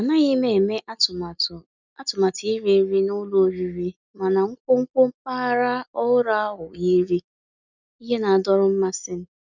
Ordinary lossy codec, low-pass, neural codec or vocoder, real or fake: none; 7.2 kHz; none; real